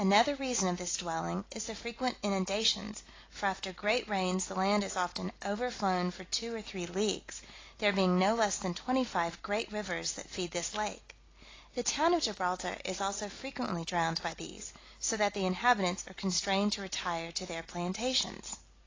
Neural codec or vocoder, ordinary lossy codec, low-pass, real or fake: none; AAC, 32 kbps; 7.2 kHz; real